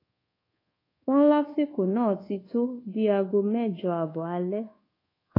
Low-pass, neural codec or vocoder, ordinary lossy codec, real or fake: 5.4 kHz; codec, 24 kHz, 1.2 kbps, DualCodec; AAC, 24 kbps; fake